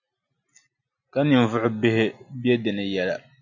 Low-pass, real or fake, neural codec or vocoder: 7.2 kHz; real; none